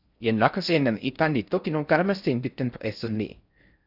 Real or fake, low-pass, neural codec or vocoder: fake; 5.4 kHz; codec, 16 kHz in and 24 kHz out, 0.6 kbps, FocalCodec, streaming, 4096 codes